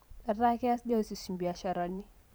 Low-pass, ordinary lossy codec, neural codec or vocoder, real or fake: none; none; vocoder, 44.1 kHz, 128 mel bands every 512 samples, BigVGAN v2; fake